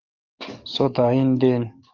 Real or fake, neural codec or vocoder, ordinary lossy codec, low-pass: fake; codec, 44.1 kHz, 7.8 kbps, DAC; Opus, 32 kbps; 7.2 kHz